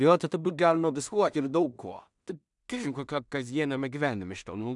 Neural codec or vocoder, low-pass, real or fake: codec, 16 kHz in and 24 kHz out, 0.4 kbps, LongCat-Audio-Codec, two codebook decoder; 10.8 kHz; fake